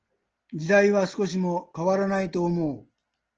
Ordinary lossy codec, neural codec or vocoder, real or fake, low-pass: Opus, 16 kbps; none; real; 7.2 kHz